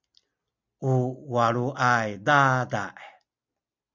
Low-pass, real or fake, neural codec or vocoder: 7.2 kHz; real; none